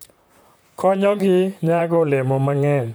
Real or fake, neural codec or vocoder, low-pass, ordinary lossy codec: fake; vocoder, 44.1 kHz, 128 mel bands, Pupu-Vocoder; none; none